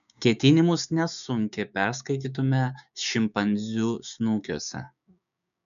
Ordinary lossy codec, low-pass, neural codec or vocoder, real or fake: MP3, 96 kbps; 7.2 kHz; codec, 16 kHz, 6 kbps, DAC; fake